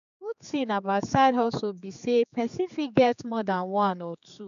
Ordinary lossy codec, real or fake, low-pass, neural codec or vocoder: none; fake; 7.2 kHz; codec, 16 kHz, 4 kbps, X-Codec, HuBERT features, trained on general audio